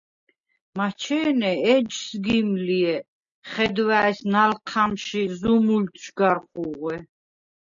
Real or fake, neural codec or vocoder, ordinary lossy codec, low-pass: real; none; MP3, 64 kbps; 7.2 kHz